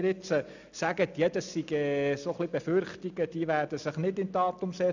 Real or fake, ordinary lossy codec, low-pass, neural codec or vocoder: real; none; 7.2 kHz; none